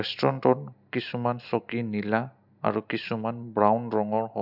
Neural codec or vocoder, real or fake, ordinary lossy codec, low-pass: none; real; none; 5.4 kHz